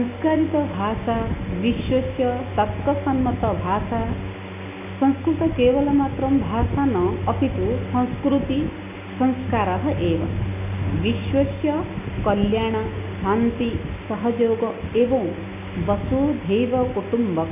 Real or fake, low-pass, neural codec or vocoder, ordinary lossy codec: real; 3.6 kHz; none; none